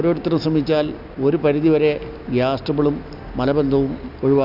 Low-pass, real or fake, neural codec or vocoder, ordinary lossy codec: 5.4 kHz; real; none; none